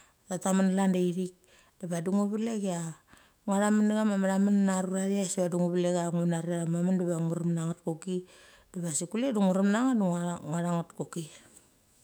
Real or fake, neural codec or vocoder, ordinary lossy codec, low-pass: fake; autoencoder, 48 kHz, 128 numbers a frame, DAC-VAE, trained on Japanese speech; none; none